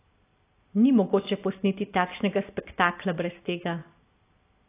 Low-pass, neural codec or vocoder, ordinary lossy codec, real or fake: 3.6 kHz; none; AAC, 24 kbps; real